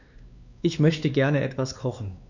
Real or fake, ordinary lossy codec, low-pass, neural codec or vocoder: fake; none; 7.2 kHz; codec, 16 kHz, 2 kbps, X-Codec, WavLM features, trained on Multilingual LibriSpeech